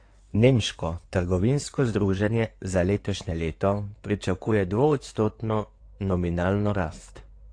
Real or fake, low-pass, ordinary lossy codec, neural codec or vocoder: fake; 9.9 kHz; AAC, 48 kbps; codec, 16 kHz in and 24 kHz out, 2.2 kbps, FireRedTTS-2 codec